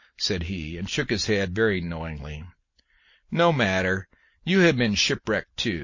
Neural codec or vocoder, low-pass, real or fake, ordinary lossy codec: none; 7.2 kHz; real; MP3, 32 kbps